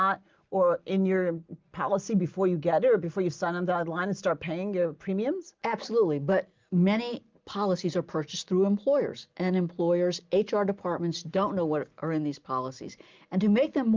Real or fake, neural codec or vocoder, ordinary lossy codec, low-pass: real; none; Opus, 24 kbps; 7.2 kHz